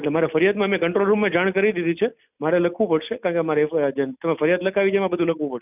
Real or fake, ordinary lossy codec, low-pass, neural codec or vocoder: real; none; 3.6 kHz; none